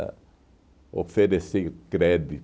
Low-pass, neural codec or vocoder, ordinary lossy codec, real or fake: none; none; none; real